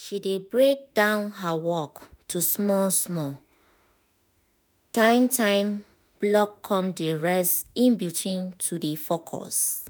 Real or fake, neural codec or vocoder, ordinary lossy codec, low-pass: fake; autoencoder, 48 kHz, 32 numbers a frame, DAC-VAE, trained on Japanese speech; none; none